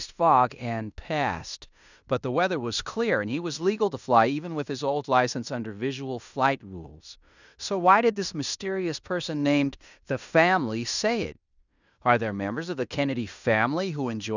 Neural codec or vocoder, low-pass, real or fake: codec, 16 kHz in and 24 kHz out, 0.9 kbps, LongCat-Audio-Codec, fine tuned four codebook decoder; 7.2 kHz; fake